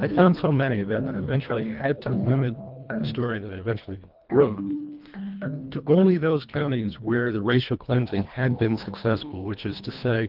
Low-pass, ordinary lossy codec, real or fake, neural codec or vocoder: 5.4 kHz; Opus, 32 kbps; fake; codec, 24 kHz, 1.5 kbps, HILCodec